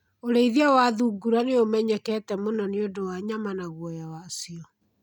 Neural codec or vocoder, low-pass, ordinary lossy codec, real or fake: none; 19.8 kHz; none; real